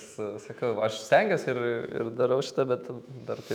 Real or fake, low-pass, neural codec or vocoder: fake; 19.8 kHz; autoencoder, 48 kHz, 128 numbers a frame, DAC-VAE, trained on Japanese speech